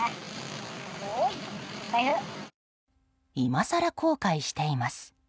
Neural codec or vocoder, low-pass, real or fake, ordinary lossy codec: none; none; real; none